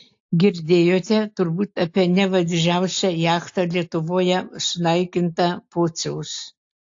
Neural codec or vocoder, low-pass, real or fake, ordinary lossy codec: none; 7.2 kHz; real; AAC, 48 kbps